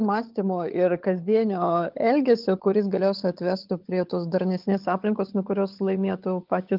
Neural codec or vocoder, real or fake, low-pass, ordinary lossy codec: codec, 16 kHz, 16 kbps, FunCodec, trained on LibriTTS, 50 frames a second; fake; 5.4 kHz; Opus, 32 kbps